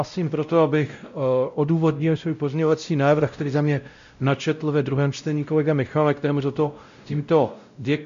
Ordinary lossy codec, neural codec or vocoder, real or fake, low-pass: MP3, 64 kbps; codec, 16 kHz, 0.5 kbps, X-Codec, WavLM features, trained on Multilingual LibriSpeech; fake; 7.2 kHz